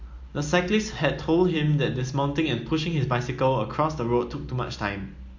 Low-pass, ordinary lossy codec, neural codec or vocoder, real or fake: 7.2 kHz; MP3, 48 kbps; none; real